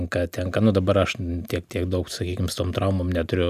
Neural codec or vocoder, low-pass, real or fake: none; 14.4 kHz; real